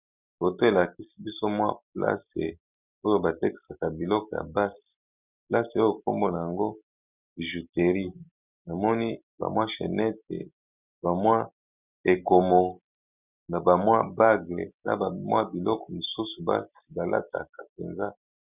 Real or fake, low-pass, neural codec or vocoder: real; 3.6 kHz; none